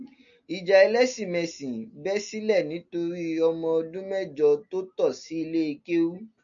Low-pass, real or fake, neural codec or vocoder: 7.2 kHz; real; none